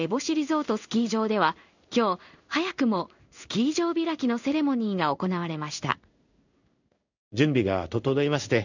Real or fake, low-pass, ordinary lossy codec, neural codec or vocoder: fake; 7.2 kHz; none; codec, 16 kHz in and 24 kHz out, 1 kbps, XY-Tokenizer